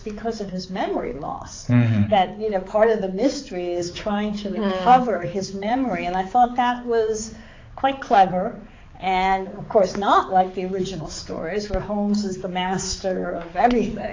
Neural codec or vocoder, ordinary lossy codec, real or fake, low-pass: codec, 16 kHz, 4 kbps, X-Codec, HuBERT features, trained on balanced general audio; AAC, 32 kbps; fake; 7.2 kHz